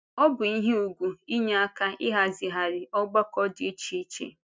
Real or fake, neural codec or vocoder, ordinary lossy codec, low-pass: real; none; none; none